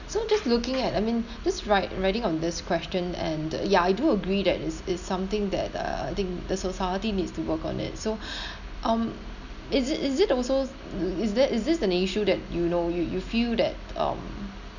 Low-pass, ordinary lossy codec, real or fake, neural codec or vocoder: 7.2 kHz; none; real; none